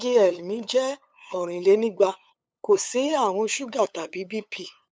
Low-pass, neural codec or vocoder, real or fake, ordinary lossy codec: none; codec, 16 kHz, 8 kbps, FunCodec, trained on LibriTTS, 25 frames a second; fake; none